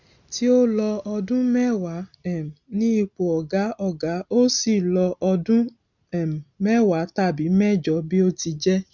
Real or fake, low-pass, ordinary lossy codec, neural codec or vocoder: real; 7.2 kHz; none; none